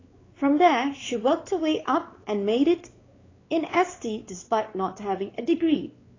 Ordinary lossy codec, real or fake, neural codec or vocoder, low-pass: AAC, 32 kbps; fake; codec, 16 kHz, 4 kbps, X-Codec, WavLM features, trained on Multilingual LibriSpeech; 7.2 kHz